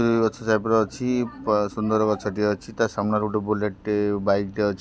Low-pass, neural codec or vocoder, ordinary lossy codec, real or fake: none; none; none; real